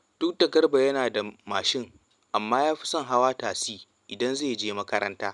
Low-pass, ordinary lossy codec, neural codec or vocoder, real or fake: 10.8 kHz; none; none; real